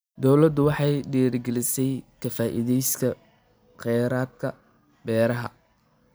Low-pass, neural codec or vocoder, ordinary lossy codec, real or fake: none; none; none; real